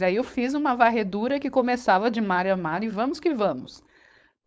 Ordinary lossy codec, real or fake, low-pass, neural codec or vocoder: none; fake; none; codec, 16 kHz, 4.8 kbps, FACodec